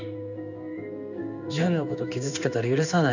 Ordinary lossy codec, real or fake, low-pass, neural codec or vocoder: none; fake; 7.2 kHz; codec, 16 kHz in and 24 kHz out, 1 kbps, XY-Tokenizer